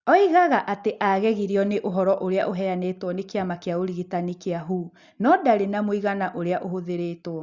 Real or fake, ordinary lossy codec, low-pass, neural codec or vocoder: real; Opus, 64 kbps; 7.2 kHz; none